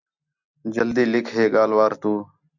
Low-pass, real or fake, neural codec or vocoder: 7.2 kHz; real; none